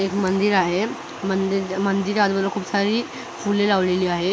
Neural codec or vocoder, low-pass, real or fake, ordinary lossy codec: none; none; real; none